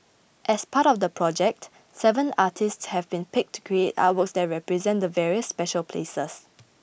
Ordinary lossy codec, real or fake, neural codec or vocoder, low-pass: none; real; none; none